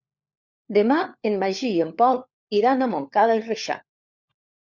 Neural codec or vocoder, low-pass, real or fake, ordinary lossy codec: codec, 16 kHz, 4 kbps, FunCodec, trained on LibriTTS, 50 frames a second; 7.2 kHz; fake; Opus, 64 kbps